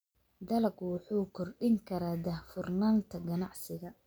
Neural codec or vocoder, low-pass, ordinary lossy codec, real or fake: none; none; none; real